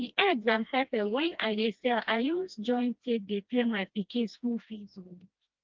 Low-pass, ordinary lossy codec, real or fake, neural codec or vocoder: 7.2 kHz; Opus, 32 kbps; fake; codec, 16 kHz, 1 kbps, FreqCodec, smaller model